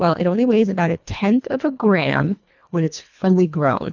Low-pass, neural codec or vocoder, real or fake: 7.2 kHz; codec, 24 kHz, 1.5 kbps, HILCodec; fake